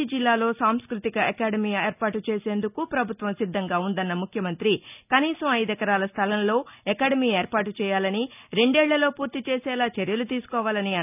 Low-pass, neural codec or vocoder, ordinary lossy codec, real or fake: 3.6 kHz; none; none; real